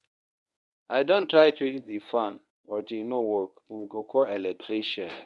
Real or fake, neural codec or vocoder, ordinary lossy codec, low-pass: fake; codec, 24 kHz, 0.9 kbps, WavTokenizer, medium speech release version 1; none; 10.8 kHz